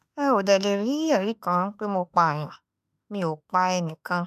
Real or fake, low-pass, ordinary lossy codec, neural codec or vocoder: fake; 14.4 kHz; none; autoencoder, 48 kHz, 32 numbers a frame, DAC-VAE, trained on Japanese speech